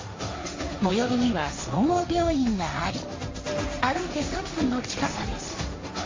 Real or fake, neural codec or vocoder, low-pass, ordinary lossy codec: fake; codec, 16 kHz, 1.1 kbps, Voila-Tokenizer; 7.2 kHz; MP3, 32 kbps